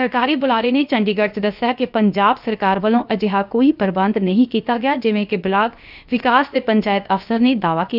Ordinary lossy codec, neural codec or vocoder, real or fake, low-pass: none; codec, 16 kHz, about 1 kbps, DyCAST, with the encoder's durations; fake; 5.4 kHz